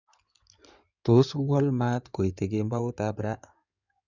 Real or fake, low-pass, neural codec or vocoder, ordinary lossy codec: fake; 7.2 kHz; codec, 16 kHz in and 24 kHz out, 2.2 kbps, FireRedTTS-2 codec; none